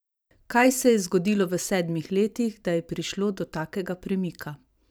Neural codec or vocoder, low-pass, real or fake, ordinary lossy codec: vocoder, 44.1 kHz, 128 mel bands every 512 samples, BigVGAN v2; none; fake; none